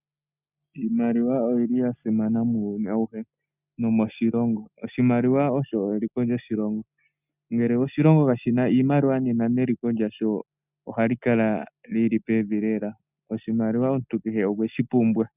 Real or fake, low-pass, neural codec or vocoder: real; 3.6 kHz; none